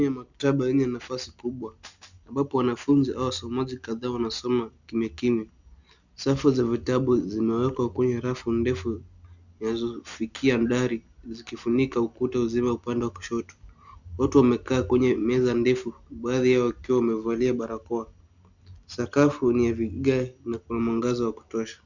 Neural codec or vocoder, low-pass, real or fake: none; 7.2 kHz; real